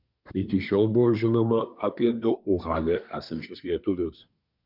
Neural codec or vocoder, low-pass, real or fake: codec, 24 kHz, 1 kbps, SNAC; 5.4 kHz; fake